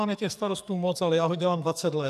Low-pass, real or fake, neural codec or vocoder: 14.4 kHz; fake; codec, 44.1 kHz, 2.6 kbps, SNAC